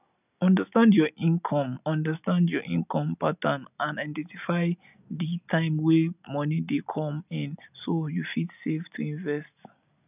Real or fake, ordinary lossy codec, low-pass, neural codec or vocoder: real; none; 3.6 kHz; none